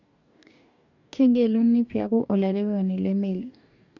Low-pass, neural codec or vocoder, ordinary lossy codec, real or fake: 7.2 kHz; codec, 44.1 kHz, 2.6 kbps, DAC; none; fake